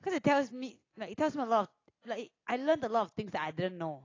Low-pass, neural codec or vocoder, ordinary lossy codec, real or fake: 7.2 kHz; none; AAC, 32 kbps; real